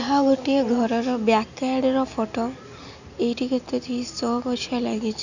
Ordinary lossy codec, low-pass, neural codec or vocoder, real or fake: none; 7.2 kHz; none; real